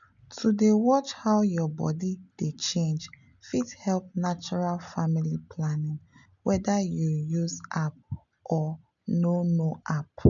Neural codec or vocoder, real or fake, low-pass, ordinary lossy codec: none; real; 7.2 kHz; none